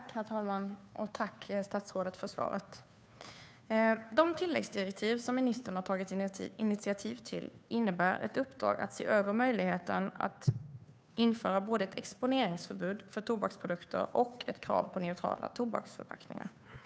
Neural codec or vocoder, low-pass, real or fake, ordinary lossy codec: codec, 16 kHz, 2 kbps, FunCodec, trained on Chinese and English, 25 frames a second; none; fake; none